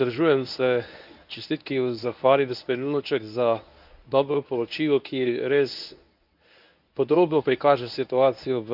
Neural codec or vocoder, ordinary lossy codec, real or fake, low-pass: codec, 24 kHz, 0.9 kbps, WavTokenizer, medium speech release version 1; none; fake; 5.4 kHz